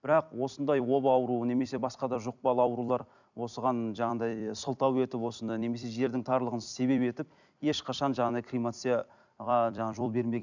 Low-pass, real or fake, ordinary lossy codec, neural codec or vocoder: 7.2 kHz; fake; none; vocoder, 44.1 kHz, 128 mel bands every 256 samples, BigVGAN v2